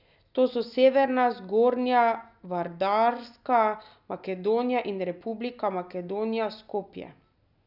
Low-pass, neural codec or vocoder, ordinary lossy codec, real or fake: 5.4 kHz; none; none; real